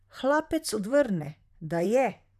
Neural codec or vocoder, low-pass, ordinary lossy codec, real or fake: vocoder, 44.1 kHz, 128 mel bands, Pupu-Vocoder; 14.4 kHz; none; fake